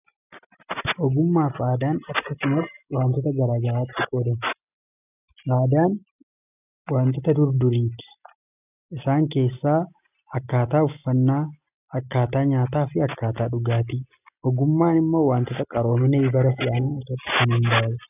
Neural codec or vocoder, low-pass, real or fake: none; 3.6 kHz; real